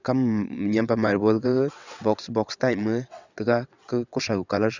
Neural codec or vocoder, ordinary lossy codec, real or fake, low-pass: vocoder, 22.05 kHz, 80 mel bands, WaveNeXt; none; fake; 7.2 kHz